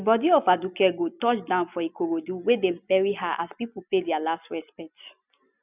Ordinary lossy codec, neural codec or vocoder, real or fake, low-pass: none; none; real; 3.6 kHz